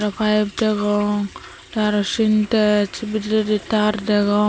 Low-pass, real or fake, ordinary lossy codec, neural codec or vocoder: none; real; none; none